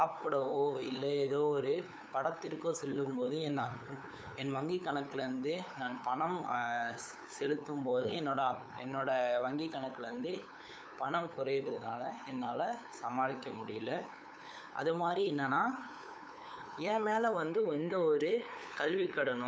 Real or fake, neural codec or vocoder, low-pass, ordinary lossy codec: fake; codec, 16 kHz, 8 kbps, FunCodec, trained on LibriTTS, 25 frames a second; none; none